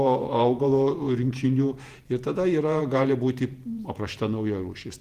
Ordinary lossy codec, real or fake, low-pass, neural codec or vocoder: Opus, 24 kbps; fake; 14.4 kHz; vocoder, 48 kHz, 128 mel bands, Vocos